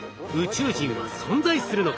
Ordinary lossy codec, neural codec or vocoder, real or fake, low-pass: none; none; real; none